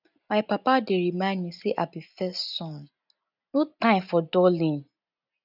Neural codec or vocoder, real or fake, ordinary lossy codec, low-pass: none; real; none; 5.4 kHz